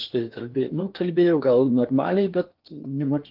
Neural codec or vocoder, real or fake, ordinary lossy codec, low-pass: codec, 16 kHz in and 24 kHz out, 0.8 kbps, FocalCodec, streaming, 65536 codes; fake; Opus, 32 kbps; 5.4 kHz